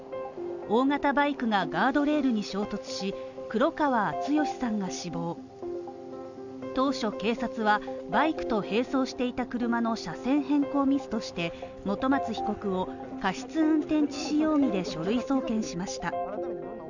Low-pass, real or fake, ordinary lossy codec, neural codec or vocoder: 7.2 kHz; real; none; none